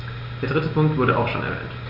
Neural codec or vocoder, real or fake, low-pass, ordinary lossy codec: none; real; 5.4 kHz; none